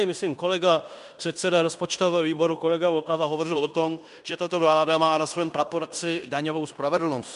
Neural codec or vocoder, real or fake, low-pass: codec, 16 kHz in and 24 kHz out, 0.9 kbps, LongCat-Audio-Codec, fine tuned four codebook decoder; fake; 10.8 kHz